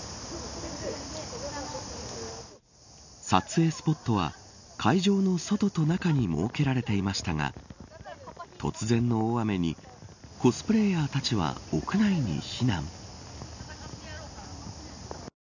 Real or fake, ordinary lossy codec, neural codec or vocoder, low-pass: real; none; none; 7.2 kHz